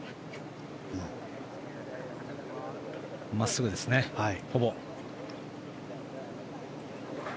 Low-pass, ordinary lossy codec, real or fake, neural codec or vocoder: none; none; real; none